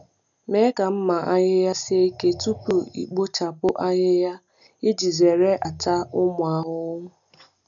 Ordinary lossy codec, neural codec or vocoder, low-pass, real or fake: none; none; 7.2 kHz; real